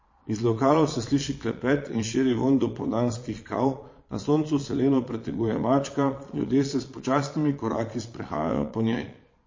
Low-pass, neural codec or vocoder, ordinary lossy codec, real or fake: 7.2 kHz; vocoder, 22.05 kHz, 80 mel bands, Vocos; MP3, 32 kbps; fake